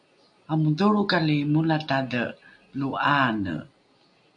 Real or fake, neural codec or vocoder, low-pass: real; none; 9.9 kHz